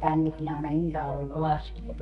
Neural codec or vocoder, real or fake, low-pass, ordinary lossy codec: codec, 24 kHz, 0.9 kbps, WavTokenizer, medium music audio release; fake; 10.8 kHz; none